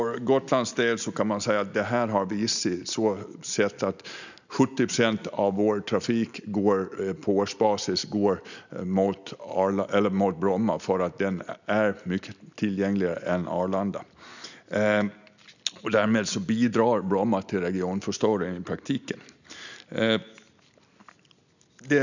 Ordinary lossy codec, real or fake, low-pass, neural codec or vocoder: none; real; 7.2 kHz; none